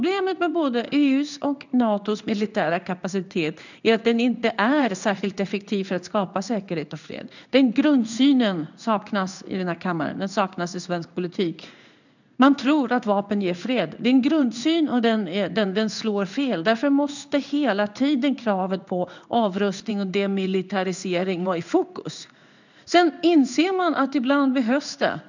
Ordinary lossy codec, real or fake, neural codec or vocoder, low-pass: none; fake; codec, 16 kHz in and 24 kHz out, 1 kbps, XY-Tokenizer; 7.2 kHz